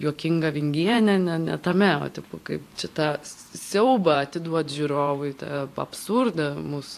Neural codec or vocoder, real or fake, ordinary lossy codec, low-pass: vocoder, 44.1 kHz, 128 mel bands every 512 samples, BigVGAN v2; fake; AAC, 64 kbps; 14.4 kHz